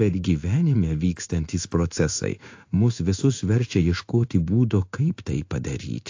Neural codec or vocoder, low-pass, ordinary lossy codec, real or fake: codec, 16 kHz in and 24 kHz out, 1 kbps, XY-Tokenizer; 7.2 kHz; AAC, 48 kbps; fake